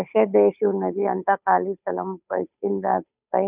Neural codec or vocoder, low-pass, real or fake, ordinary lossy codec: codec, 16 kHz, 8 kbps, FunCodec, trained on Chinese and English, 25 frames a second; 3.6 kHz; fake; none